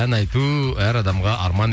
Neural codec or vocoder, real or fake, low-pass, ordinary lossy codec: none; real; none; none